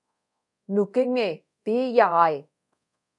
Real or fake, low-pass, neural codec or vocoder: fake; 10.8 kHz; codec, 24 kHz, 0.5 kbps, DualCodec